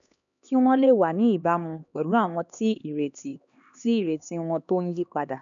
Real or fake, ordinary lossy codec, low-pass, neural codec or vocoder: fake; none; 7.2 kHz; codec, 16 kHz, 2 kbps, X-Codec, HuBERT features, trained on LibriSpeech